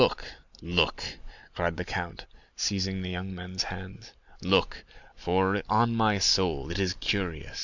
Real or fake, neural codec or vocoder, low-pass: real; none; 7.2 kHz